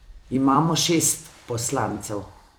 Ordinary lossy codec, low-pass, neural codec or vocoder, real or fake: none; none; vocoder, 44.1 kHz, 128 mel bands every 256 samples, BigVGAN v2; fake